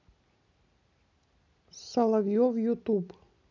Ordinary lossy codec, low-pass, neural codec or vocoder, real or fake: none; 7.2 kHz; none; real